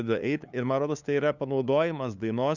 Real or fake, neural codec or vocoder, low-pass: fake; codec, 16 kHz, 2 kbps, FunCodec, trained on LibriTTS, 25 frames a second; 7.2 kHz